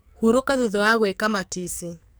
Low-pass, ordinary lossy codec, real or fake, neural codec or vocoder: none; none; fake; codec, 44.1 kHz, 2.6 kbps, SNAC